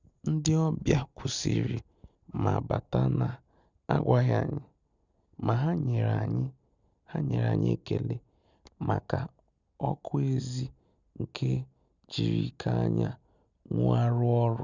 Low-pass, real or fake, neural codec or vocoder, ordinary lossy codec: 7.2 kHz; real; none; Opus, 64 kbps